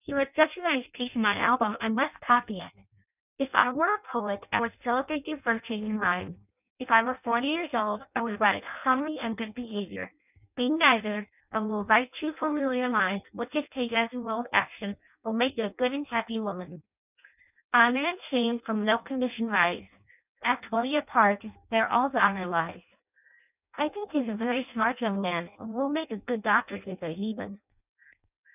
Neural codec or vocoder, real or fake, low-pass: codec, 16 kHz in and 24 kHz out, 0.6 kbps, FireRedTTS-2 codec; fake; 3.6 kHz